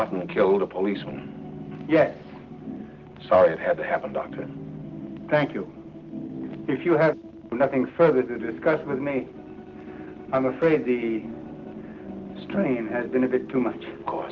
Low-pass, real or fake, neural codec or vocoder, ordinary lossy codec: 7.2 kHz; real; none; Opus, 16 kbps